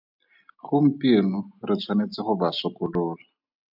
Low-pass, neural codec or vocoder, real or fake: 5.4 kHz; none; real